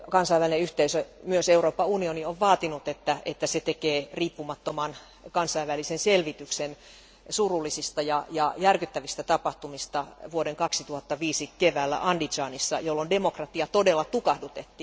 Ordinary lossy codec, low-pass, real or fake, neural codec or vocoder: none; none; real; none